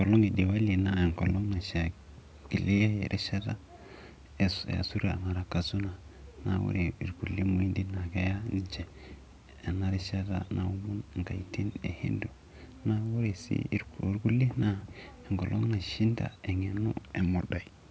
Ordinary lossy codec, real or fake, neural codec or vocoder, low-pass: none; real; none; none